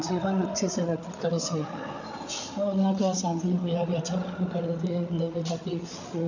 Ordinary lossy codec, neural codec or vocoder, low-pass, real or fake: none; codec, 16 kHz, 8 kbps, FreqCodec, larger model; 7.2 kHz; fake